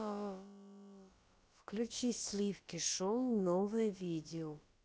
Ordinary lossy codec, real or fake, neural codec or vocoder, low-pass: none; fake; codec, 16 kHz, about 1 kbps, DyCAST, with the encoder's durations; none